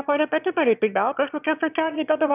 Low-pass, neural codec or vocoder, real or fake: 3.6 kHz; autoencoder, 22.05 kHz, a latent of 192 numbers a frame, VITS, trained on one speaker; fake